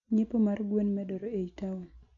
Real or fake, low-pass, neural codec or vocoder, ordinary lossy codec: real; 7.2 kHz; none; MP3, 48 kbps